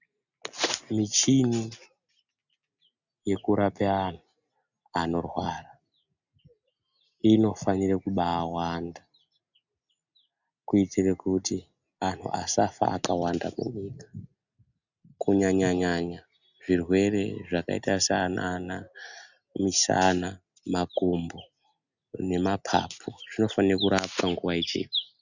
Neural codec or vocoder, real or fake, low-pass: none; real; 7.2 kHz